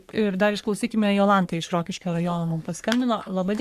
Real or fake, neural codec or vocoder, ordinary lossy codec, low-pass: fake; codec, 44.1 kHz, 3.4 kbps, Pupu-Codec; Opus, 64 kbps; 14.4 kHz